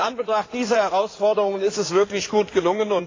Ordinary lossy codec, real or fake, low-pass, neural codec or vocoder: AAC, 32 kbps; fake; 7.2 kHz; codec, 16 kHz in and 24 kHz out, 2.2 kbps, FireRedTTS-2 codec